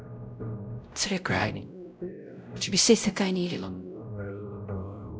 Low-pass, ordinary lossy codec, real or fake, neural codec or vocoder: none; none; fake; codec, 16 kHz, 0.5 kbps, X-Codec, WavLM features, trained on Multilingual LibriSpeech